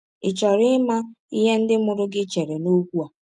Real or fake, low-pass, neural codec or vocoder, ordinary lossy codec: real; 10.8 kHz; none; none